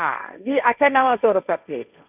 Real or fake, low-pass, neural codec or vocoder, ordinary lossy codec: fake; 3.6 kHz; codec, 16 kHz, 1.1 kbps, Voila-Tokenizer; none